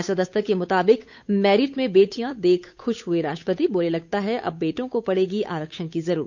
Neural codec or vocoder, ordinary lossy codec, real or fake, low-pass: codec, 16 kHz, 8 kbps, FunCodec, trained on Chinese and English, 25 frames a second; none; fake; 7.2 kHz